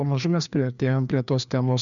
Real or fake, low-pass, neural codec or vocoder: fake; 7.2 kHz; codec, 16 kHz, 2 kbps, FreqCodec, larger model